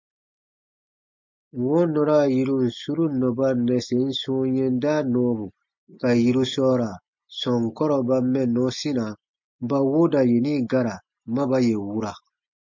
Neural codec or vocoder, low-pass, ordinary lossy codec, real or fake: none; 7.2 kHz; MP3, 48 kbps; real